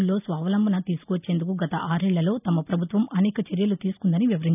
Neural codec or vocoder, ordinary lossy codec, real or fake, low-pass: none; none; real; 3.6 kHz